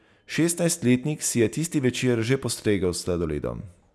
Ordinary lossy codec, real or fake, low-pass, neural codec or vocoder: none; real; none; none